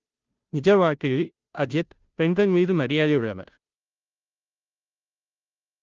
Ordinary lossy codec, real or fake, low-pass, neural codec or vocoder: Opus, 24 kbps; fake; 7.2 kHz; codec, 16 kHz, 0.5 kbps, FunCodec, trained on Chinese and English, 25 frames a second